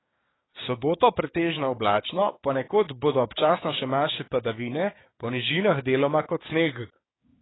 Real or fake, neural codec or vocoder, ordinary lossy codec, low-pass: fake; codec, 16 kHz, 6 kbps, DAC; AAC, 16 kbps; 7.2 kHz